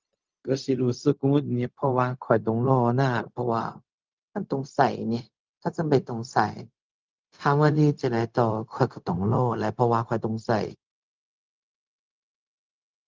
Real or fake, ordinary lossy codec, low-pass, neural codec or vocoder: fake; Opus, 24 kbps; 7.2 kHz; codec, 16 kHz, 0.4 kbps, LongCat-Audio-Codec